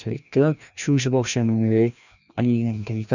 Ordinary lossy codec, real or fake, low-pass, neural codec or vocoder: none; fake; 7.2 kHz; codec, 16 kHz, 1 kbps, FreqCodec, larger model